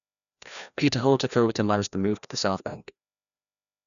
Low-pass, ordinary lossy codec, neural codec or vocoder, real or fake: 7.2 kHz; none; codec, 16 kHz, 1 kbps, FreqCodec, larger model; fake